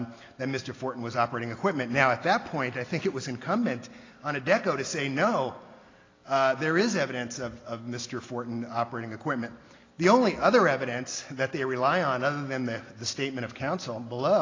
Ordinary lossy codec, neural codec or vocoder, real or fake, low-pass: AAC, 32 kbps; none; real; 7.2 kHz